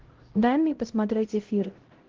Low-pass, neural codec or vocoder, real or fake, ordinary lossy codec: 7.2 kHz; codec, 16 kHz, 0.5 kbps, X-Codec, HuBERT features, trained on LibriSpeech; fake; Opus, 16 kbps